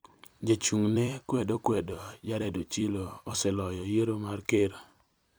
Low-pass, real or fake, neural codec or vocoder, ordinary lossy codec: none; fake; vocoder, 44.1 kHz, 128 mel bands every 256 samples, BigVGAN v2; none